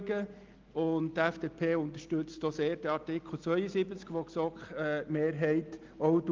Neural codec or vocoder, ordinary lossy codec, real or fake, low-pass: none; Opus, 24 kbps; real; 7.2 kHz